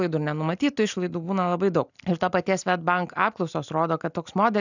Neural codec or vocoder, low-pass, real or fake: none; 7.2 kHz; real